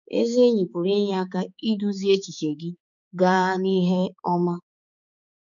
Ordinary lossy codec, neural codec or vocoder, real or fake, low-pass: none; codec, 16 kHz, 4 kbps, X-Codec, HuBERT features, trained on balanced general audio; fake; 7.2 kHz